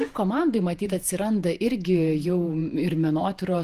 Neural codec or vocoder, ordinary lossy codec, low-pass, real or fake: vocoder, 48 kHz, 128 mel bands, Vocos; Opus, 24 kbps; 14.4 kHz; fake